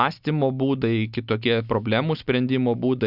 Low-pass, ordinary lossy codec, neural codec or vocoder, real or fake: 5.4 kHz; Opus, 64 kbps; none; real